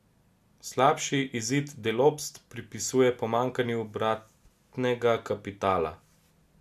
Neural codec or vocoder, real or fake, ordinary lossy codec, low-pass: none; real; MP3, 96 kbps; 14.4 kHz